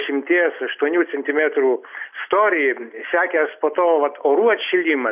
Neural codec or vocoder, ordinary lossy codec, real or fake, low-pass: none; AAC, 32 kbps; real; 3.6 kHz